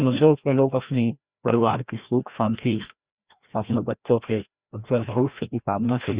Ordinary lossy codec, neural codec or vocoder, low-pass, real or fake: Opus, 64 kbps; codec, 16 kHz, 1 kbps, FreqCodec, larger model; 3.6 kHz; fake